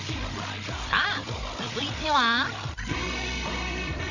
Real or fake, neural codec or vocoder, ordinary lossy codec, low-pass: fake; codec, 16 kHz, 16 kbps, FreqCodec, larger model; none; 7.2 kHz